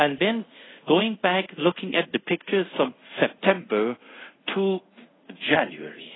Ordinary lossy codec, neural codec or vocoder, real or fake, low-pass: AAC, 16 kbps; codec, 24 kHz, 0.5 kbps, DualCodec; fake; 7.2 kHz